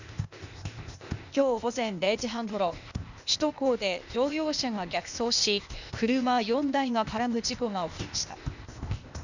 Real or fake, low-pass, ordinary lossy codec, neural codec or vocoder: fake; 7.2 kHz; none; codec, 16 kHz, 0.8 kbps, ZipCodec